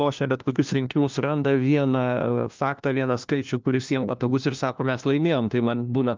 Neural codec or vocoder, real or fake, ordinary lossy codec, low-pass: codec, 16 kHz, 1 kbps, FunCodec, trained on LibriTTS, 50 frames a second; fake; Opus, 24 kbps; 7.2 kHz